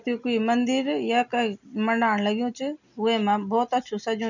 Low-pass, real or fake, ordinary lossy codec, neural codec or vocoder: 7.2 kHz; real; none; none